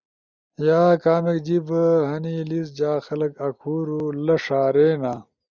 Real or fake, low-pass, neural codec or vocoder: real; 7.2 kHz; none